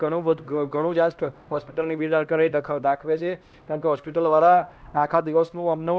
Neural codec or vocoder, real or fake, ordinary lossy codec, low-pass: codec, 16 kHz, 1 kbps, X-Codec, HuBERT features, trained on LibriSpeech; fake; none; none